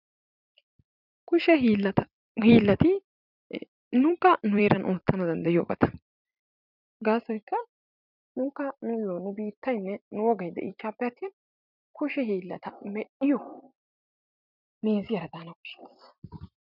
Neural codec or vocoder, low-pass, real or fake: vocoder, 44.1 kHz, 128 mel bands every 256 samples, BigVGAN v2; 5.4 kHz; fake